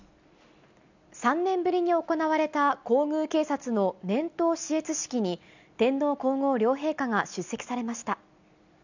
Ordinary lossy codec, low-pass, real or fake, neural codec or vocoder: none; 7.2 kHz; real; none